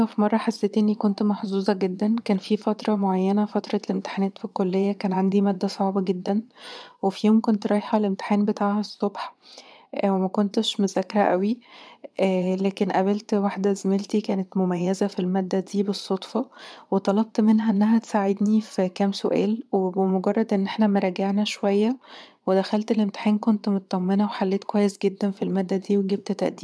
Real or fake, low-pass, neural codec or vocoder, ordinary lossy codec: fake; none; vocoder, 22.05 kHz, 80 mel bands, Vocos; none